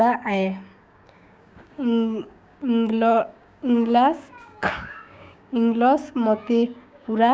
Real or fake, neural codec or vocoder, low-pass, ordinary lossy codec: fake; codec, 16 kHz, 6 kbps, DAC; none; none